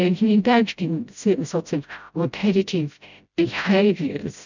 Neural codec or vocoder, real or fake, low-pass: codec, 16 kHz, 0.5 kbps, FreqCodec, smaller model; fake; 7.2 kHz